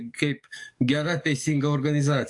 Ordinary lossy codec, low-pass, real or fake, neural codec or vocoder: AAC, 64 kbps; 10.8 kHz; fake; codec, 44.1 kHz, 7.8 kbps, DAC